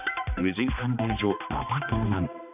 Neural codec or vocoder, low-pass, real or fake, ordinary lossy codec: codec, 16 kHz, 2 kbps, X-Codec, HuBERT features, trained on balanced general audio; 3.6 kHz; fake; none